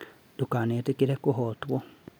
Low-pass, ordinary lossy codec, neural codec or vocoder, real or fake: none; none; none; real